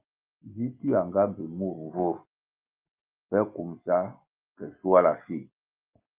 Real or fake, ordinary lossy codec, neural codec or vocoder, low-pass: real; Opus, 32 kbps; none; 3.6 kHz